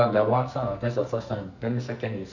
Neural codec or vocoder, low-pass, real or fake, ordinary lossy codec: codec, 44.1 kHz, 2.6 kbps, SNAC; 7.2 kHz; fake; none